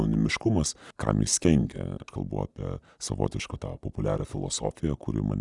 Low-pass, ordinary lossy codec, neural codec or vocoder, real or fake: 10.8 kHz; Opus, 64 kbps; none; real